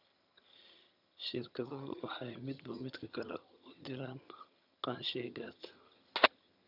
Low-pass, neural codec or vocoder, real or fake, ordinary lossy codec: 5.4 kHz; vocoder, 22.05 kHz, 80 mel bands, HiFi-GAN; fake; none